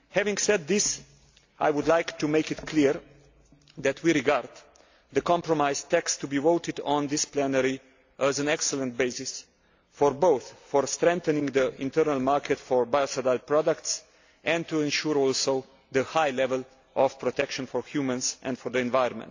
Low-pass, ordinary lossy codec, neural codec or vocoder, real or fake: 7.2 kHz; AAC, 48 kbps; none; real